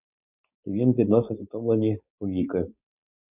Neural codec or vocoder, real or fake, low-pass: codec, 16 kHz, 4.8 kbps, FACodec; fake; 3.6 kHz